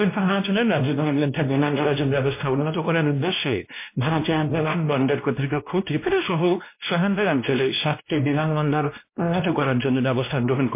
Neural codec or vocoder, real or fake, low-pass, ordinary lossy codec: codec, 16 kHz, 1 kbps, X-Codec, WavLM features, trained on Multilingual LibriSpeech; fake; 3.6 kHz; MP3, 24 kbps